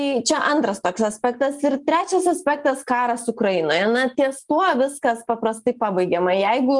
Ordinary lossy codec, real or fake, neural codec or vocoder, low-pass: Opus, 32 kbps; real; none; 10.8 kHz